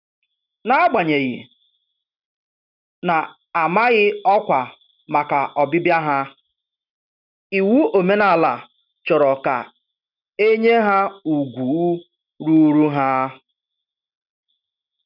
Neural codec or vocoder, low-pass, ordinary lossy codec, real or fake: none; 5.4 kHz; none; real